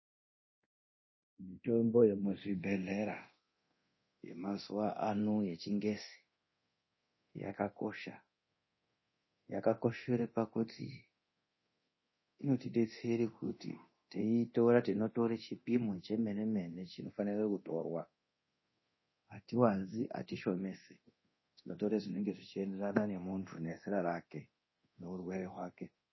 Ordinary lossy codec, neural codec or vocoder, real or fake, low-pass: MP3, 24 kbps; codec, 24 kHz, 0.9 kbps, DualCodec; fake; 7.2 kHz